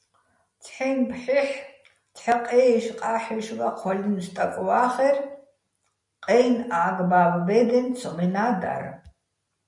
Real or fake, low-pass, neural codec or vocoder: real; 10.8 kHz; none